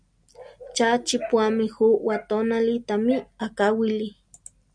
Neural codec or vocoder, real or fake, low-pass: none; real; 9.9 kHz